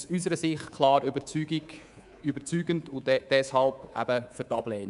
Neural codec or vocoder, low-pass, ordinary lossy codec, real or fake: codec, 24 kHz, 3.1 kbps, DualCodec; 10.8 kHz; none; fake